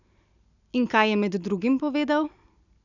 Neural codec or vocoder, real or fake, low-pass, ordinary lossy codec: none; real; 7.2 kHz; none